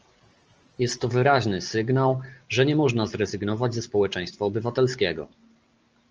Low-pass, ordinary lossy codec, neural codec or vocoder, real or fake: 7.2 kHz; Opus, 24 kbps; none; real